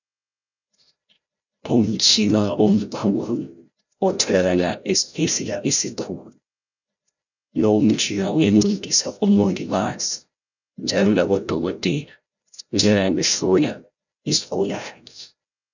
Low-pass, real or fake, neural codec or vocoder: 7.2 kHz; fake; codec, 16 kHz, 0.5 kbps, FreqCodec, larger model